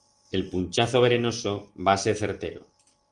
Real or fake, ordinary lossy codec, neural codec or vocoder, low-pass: real; Opus, 24 kbps; none; 9.9 kHz